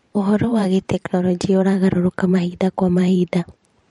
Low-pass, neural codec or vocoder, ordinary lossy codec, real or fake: 19.8 kHz; vocoder, 44.1 kHz, 128 mel bands every 512 samples, BigVGAN v2; MP3, 48 kbps; fake